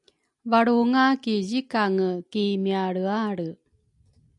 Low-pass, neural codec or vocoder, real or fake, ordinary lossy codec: 10.8 kHz; none; real; MP3, 96 kbps